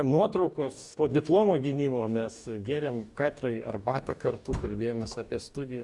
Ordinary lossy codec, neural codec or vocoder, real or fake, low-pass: Opus, 64 kbps; codec, 44.1 kHz, 2.6 kbps, DAC; fake; 10.8 kHz